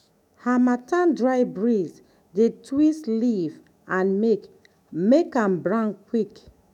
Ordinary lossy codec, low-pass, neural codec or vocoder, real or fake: none; 19.8 kHz; autoencoder, 48 kHz, 128 numbers a frame, DAC-VAE, trained on Japanese speech; fake